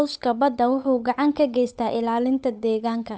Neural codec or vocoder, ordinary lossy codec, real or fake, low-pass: codec, 16 kHz, 8 kbps, FunCodec, trained on Chinese and English, 25 frames a second; none; fake; none